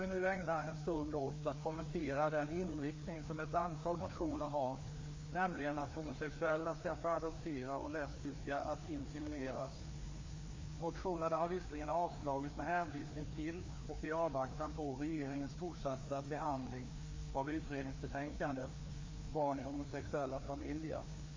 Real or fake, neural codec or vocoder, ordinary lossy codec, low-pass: fake; codec, 16 kHz, 2 kbps, FreqCodec, larger model; MP3, 32 kbps; 7.2 kHz